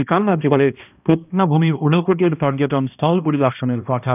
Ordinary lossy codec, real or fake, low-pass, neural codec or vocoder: none; fake; 3.6 kHz; codec, 16 kHz, 1 kbps, X-Codec, HuBERT features, trained on balanced general audio